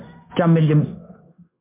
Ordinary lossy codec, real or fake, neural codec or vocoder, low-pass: MP3, 32 kbps; fake; codec, 16 kHz, 0.9 kbps, LongCat-Audio-Codec; 3.6 kHz